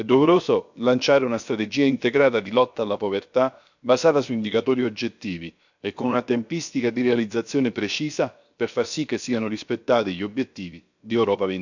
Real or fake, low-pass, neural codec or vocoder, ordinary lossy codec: fake; 7.2 kHz; codec, 16 kHz, about 1 kbps, DyCAST, with the encoder's durations; none